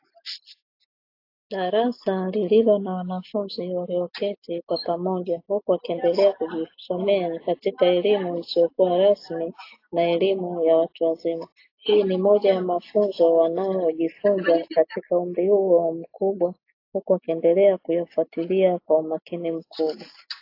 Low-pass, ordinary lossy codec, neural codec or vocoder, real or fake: 5.4 kHz; AAC, 32 kbps; none; real